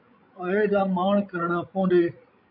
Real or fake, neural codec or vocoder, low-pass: fake; codec, 16 kHz, 16 kbps, FreqCodec, larger model; 5.4 kHz